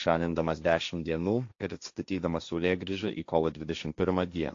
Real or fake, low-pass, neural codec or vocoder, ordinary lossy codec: fake; 7.2 kHz; codec, 16 kHz, 1.1 kbps, Voila-Tokenizer; AAC, 48 kbps